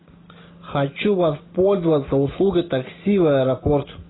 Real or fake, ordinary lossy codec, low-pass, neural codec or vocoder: real; AAC, 16 kbps; 7.2 kHz; none